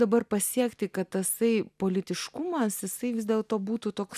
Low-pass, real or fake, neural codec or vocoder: 14.4 kHz; real; none